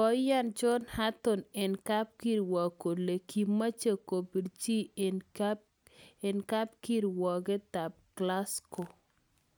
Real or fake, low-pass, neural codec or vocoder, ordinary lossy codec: real; none; none; none